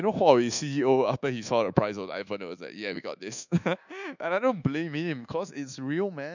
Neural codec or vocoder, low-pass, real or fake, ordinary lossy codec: codec, 24 kHz, 1.2 kbps, DualCodec; 7.2 kHz; fake; none